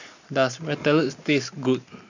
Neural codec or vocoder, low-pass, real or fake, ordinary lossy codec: none; 7.2 kHz; real; none